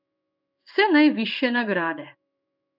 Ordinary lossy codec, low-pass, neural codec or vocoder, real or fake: none; 5.4 kHz; codec, 16 kHz in and 24 kHz out, 1 kbps, XY-Tokenizer; fake